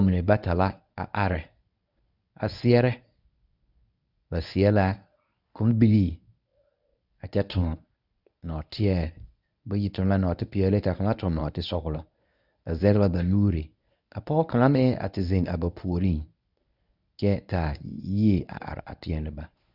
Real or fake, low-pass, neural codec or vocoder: fake; 5.4 kHz; codec, 24 kHz, 0.9 kbps, WavTokenizer, medium speech release version 1